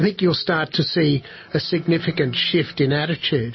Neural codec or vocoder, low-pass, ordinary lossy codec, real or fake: none; 7.2 kHz; MP3, 24 kbps; real